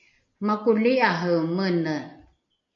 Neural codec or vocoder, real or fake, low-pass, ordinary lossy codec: none; real; 7.2 kHz; AAC, 64 kbps